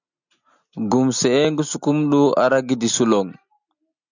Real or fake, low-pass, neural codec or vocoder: real; 7.2 kHz; none